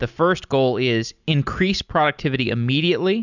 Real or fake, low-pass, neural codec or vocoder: real; 7.2 kHz; none